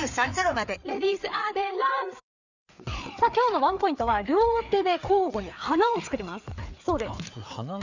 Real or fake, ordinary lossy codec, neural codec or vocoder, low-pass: fake; none; codec, 16 kHz, 4 kbps, FreqCodec, larger model; 7.2 kHz